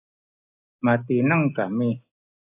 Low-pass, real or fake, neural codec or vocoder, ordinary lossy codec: 3.6 kHz; real; none; AAC, 24 kbps